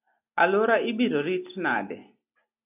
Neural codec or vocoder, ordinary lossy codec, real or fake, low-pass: none; AAC, 32 kbps; real; 3.6 kHz